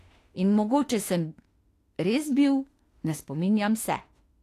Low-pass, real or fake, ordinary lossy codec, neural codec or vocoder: 14.4 kHz; fake; AAC, 48 kbps; autoencoder, 48 kHz, 32 numbers a frame, DAC-VAE, trained on Japanese speech